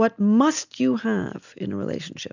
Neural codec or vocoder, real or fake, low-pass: none; real; 7.2 kHz